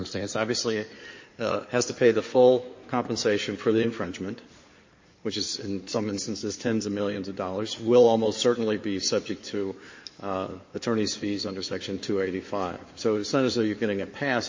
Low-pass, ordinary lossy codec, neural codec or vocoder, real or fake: 7.2 kHz; MP3, 32 kbps; codec, 16 kHz in and 24 kHz out, 2.2 kbps, FireRedTTS-2 codec; fake